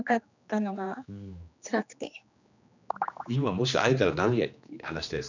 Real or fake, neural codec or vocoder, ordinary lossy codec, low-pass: fake; codec, 16 kHz, 2 kbps, X-Codec, HuBERT features, trained on general audio; none; 7.2 kHz